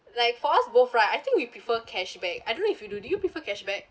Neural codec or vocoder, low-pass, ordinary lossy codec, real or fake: none; none; none; real